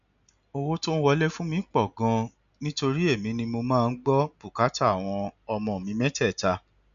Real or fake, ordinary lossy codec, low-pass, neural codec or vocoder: real; MP3, 96 kbps; 7.2 kHz; none